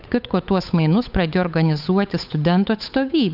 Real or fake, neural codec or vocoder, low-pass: fake; codec, 16 kHz, 8 kbps, FunCodec, trained on Chinese and English, 25 frames a second; 5.4 kHz